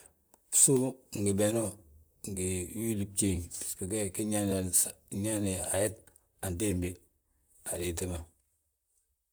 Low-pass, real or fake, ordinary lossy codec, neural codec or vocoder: none; fake; none; vocoder, 44.1 kHz, 128 mel bands, Pupu-Vocoder